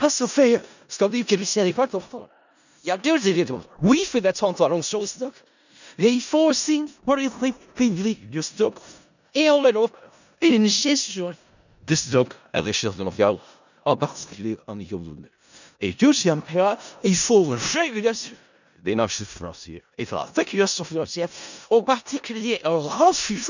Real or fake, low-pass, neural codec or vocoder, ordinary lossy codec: fake; 7.2 kHz; codec, 16 kHz in and 24 kHz out, 0.4 kbps, LongCat-Audio-Codec, four codebook decoder; none